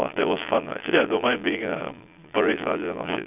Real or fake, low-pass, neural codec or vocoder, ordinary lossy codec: fake; 3.6 kHz; vocoder, 22.05 kHz, 80 mel bands, Vocos; none